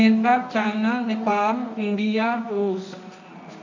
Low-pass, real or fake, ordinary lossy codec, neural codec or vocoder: 7.2 kHz; fake; none; codec, 24 kHz, 0.9 kbps, WavTokenizer, medium music audio release